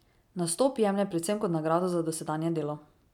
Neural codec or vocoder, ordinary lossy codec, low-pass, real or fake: none; none; 19.8 kHz; real